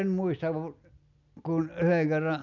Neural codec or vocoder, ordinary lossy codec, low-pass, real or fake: none; none; 7.2 kHz; real